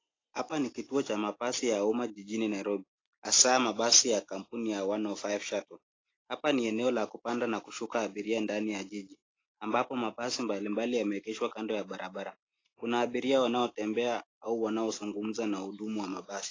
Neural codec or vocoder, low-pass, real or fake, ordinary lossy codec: none; 7.2 kHz; real; AAC, 32 kbps